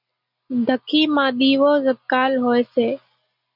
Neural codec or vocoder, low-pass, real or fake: none; 5.4 kHz; real